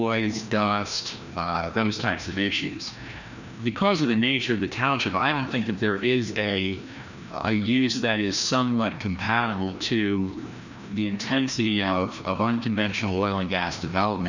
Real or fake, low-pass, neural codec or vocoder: fake; 7.2 kHz; codec, 16 kHz, 1 kbps, FreqCodec, larger model